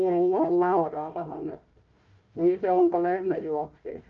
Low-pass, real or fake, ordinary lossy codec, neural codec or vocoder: 7.2 kHz; fake; Opus, 24 kbps; codec, 16 kHz, 1 kbps, FunCodec, trained on Chinese and English, 50 frames a second